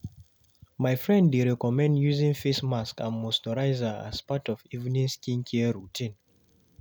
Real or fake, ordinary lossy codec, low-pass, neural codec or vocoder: real; none; none; none